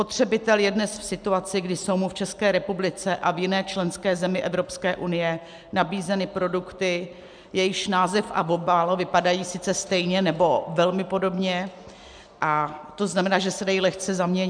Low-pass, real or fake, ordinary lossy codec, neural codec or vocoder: 9.9 kHz; real; MP3, 96 kbps; none